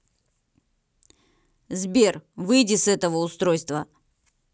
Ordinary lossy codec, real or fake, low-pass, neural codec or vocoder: none; real; none; none